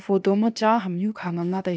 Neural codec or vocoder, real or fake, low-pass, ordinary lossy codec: codec, 16 kHz, 0.8 kbps, ZipCodec; fake; none; none